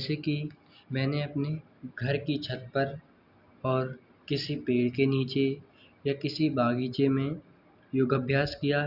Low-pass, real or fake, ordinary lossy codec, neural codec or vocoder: 5.4 kHz; real; Opus, 64 kbps; none